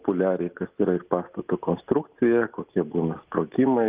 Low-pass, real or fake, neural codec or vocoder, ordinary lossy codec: 3.6 kHz; real; none; Opus, 24 kbps